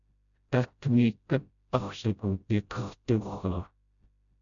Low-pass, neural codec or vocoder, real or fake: 7.2 kHz; codec, 16 kHz, 0.5 kbps, FreqCodec, smaller model; fake